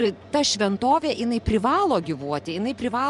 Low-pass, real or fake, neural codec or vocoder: 10.8 kHz; fake; vocoder, 24 kHz, 100 mel bands, Vocos